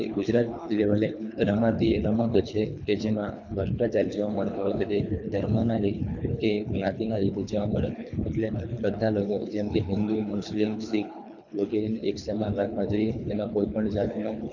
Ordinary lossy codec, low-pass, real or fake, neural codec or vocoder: none; 7.2 kHz; fake; codec, 24 kHz, 3 kbps, HILCodec